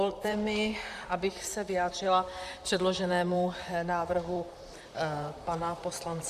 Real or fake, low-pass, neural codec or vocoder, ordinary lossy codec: fake; 14.4 kHz; vocoder, 44.1 kHz, 128 mel bands, Pupu-Vocoder; Opus, 64 kbps